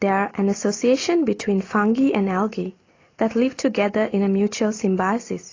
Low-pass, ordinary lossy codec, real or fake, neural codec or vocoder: 7.2 kHz; AAC, 32 kbps; real; none